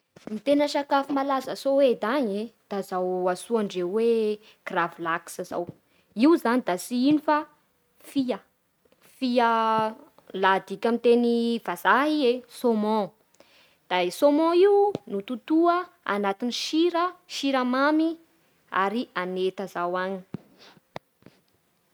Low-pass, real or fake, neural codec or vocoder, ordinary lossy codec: none; real; none; none